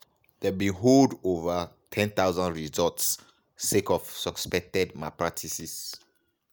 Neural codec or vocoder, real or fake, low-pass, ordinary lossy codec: none; real; none; none